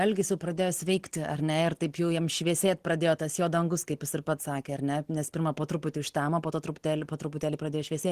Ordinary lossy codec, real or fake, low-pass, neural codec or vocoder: Opus, 16 kbps; real; 14.4 kHz; none